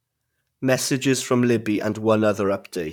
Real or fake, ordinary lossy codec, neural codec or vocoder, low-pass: fake; none; vocoder, 44.1 kHz, 128 mel bands, Pupu-Vocoder; 19.8 kHz